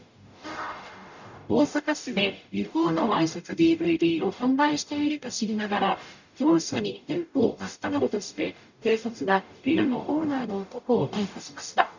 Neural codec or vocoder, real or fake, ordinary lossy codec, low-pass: codec, 44.1 kHz, 0.9 kbps, DAC; fake; none; 7.2 kHz